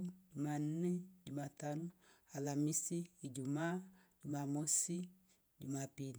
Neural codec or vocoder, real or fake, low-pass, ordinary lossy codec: vocoder, 48 kHz, 128 mel bands, Vocos; fake; none; none